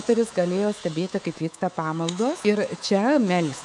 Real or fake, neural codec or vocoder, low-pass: fake; codec, 24 kHz, 3.1 kbps, DualCodec; 10.8 kHz